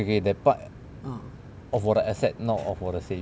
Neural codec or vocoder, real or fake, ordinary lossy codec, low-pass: none; real; none; none